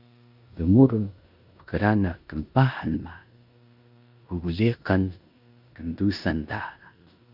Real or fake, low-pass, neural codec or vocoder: fake; 5.4 kHz; codec, 16 kHz in and 24 kHz out, 0.9 kbps, LongCat-Audio-Codec, four codebook decoder